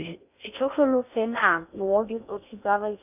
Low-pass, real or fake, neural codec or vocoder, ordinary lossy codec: 3.6 kHz; fake; codec, 16 kHz in and 24 kHz out, 0.6 kbps, FocalCodec, streaming, 4096 codes; none